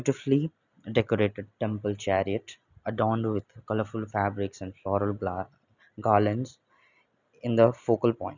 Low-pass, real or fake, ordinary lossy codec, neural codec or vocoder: 7.2 kHz; real; none; none